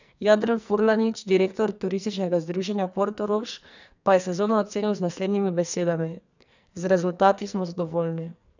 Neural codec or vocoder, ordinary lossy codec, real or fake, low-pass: codec, 44.1 kHz, 2.6 kbps, SNAC; none; fake; 7.2 kHz